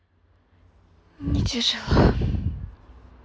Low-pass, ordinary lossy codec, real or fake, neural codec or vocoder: none; none; real; none